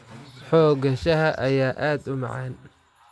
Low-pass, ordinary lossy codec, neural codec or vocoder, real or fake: none; none; none; real